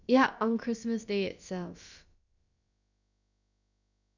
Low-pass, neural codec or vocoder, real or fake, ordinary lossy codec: 7.2 kHz; codec, 16 kHz, about 1 kbps, DyCAST, with the encoder's durations; fake; none